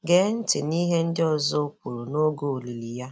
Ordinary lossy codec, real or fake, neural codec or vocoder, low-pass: none; real; none; none